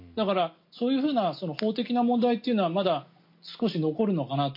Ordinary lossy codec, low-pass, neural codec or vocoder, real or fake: MP3, 32 kbps; 5.4 kHz; none; real